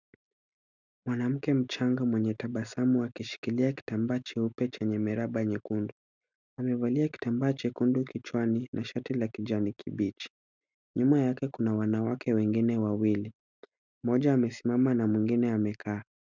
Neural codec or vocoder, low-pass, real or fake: none; 7.2 kHz; real